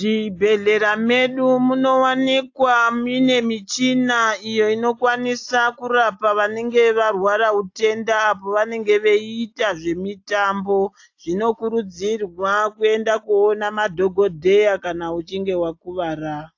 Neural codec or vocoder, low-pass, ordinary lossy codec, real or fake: none; 7.2 kHz; AAC, 48 kbps; real